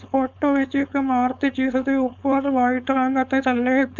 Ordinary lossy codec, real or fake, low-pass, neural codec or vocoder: none; fake; 7.2 kHz; codec, 16 kHz, 4.8 kbps, FACodec